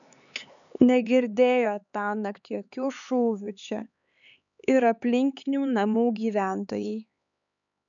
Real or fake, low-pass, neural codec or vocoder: fake; 7.2 kHz; codec, 16 kHz, 4 kbps, X-Codec, HuBERT features, trained on LibriSpeech